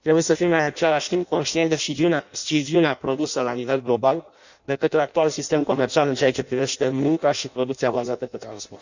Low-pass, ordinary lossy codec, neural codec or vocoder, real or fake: 7.2 kHz; none; codec, 16 kHz in and 24 kHz out, 0.6 kbps, FireRedTTS-2 codec; fake